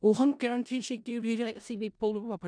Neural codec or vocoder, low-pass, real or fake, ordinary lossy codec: codec, 16 kHz in and 24 kHz out, 0.4 kbps, LongCat-Audio-Codec, four codebook decoder; 9.9 kHz; fake; none